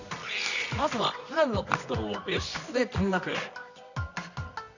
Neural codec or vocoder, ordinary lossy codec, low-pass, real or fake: codec, 24 kHz, 0.9 kbps, WavTokenizer, medium music audio release; none; 7.2 kHz; fake